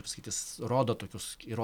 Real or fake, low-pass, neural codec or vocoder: real; 19.8 kHz; none